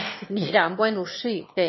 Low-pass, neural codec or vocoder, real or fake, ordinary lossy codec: 7.2 kHz; autoencoder, 22.05 kHz, a latent of 192 numbers a frame, VITS, trained on one speaker; fake; MP3, 24 kbps